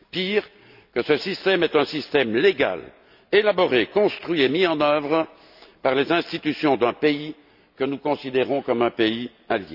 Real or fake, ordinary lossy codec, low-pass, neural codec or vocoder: real; none; 5.4 kHz; none